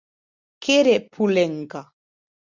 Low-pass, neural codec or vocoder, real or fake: 7.2 kHz; none; real